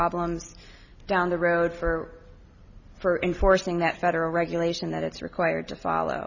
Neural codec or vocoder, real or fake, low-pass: none; real; 7.2 kHz